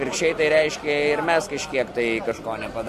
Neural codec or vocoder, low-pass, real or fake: none; 14.4 kHz; real